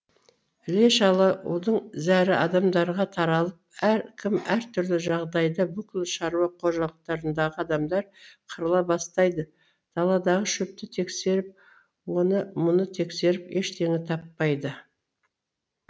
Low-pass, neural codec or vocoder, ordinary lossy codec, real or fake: none; none; none; real